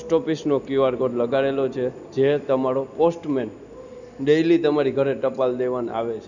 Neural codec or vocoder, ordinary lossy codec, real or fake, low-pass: none; none; real; 7.2 kHz